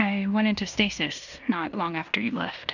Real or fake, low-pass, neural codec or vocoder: fake; 7.2 kHz; codec, 16 kHz in and 24 kHz out, 0.9 kbps, LongCat-Audio-Codec, fine tuned four codebook decoder